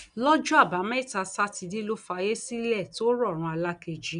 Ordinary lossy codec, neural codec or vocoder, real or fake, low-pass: none; none; real; 9.9 kHz